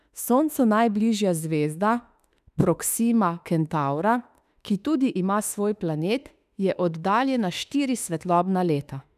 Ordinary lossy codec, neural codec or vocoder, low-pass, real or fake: none; autoencoder, 48 kHz, 32 numbers a frame, DAC-VAE, trained on Japanese speech; 14.4 kHz; fake